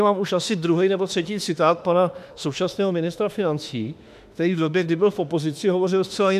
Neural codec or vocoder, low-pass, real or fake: autoencoder, 48 kHz, 32 numbers a frame, DAC-VAE, trained on Japanese speech; 14.4 kHz; fake